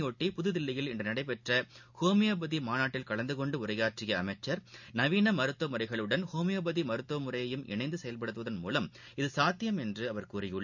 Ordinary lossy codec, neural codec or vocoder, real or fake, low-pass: none; none; real; 7.2 kHz